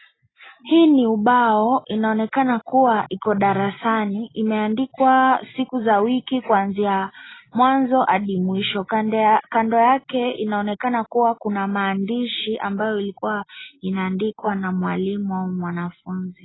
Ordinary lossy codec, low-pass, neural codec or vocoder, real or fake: AAC, 16 kbps; 7.2 kHz; none; real